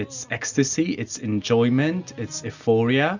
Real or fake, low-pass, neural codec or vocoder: real; 7.2 kHz; none